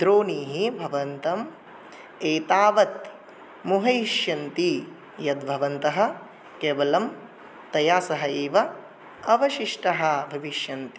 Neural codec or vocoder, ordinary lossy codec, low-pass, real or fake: none; none; none; real